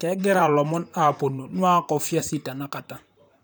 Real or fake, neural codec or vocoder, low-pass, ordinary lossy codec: fake; vocoder, 44.1 kHz, 128 mel bands, Pupu-Vocoder; none; none